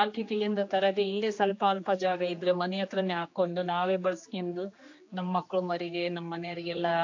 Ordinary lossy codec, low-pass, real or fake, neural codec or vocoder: AAC, 48 kbps; 7.2 kHz; fake; codec, 16 kHz, 2 kbps, X-Codec, HuBERT features, trained on general audio